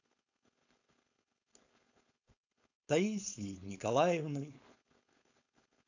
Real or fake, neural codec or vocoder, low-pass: fake; codec, 16 kHz, 4.8 kbps, FACodec; 7.2 kHz